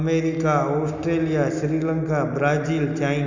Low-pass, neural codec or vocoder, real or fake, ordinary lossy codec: 7.2 kHz; none; real; none